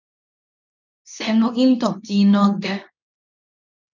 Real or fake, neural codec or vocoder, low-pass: fake; codec, 24 kHz, 0.9 kbps, WavTokenizer, medium speech release version 2; 7.2 kHz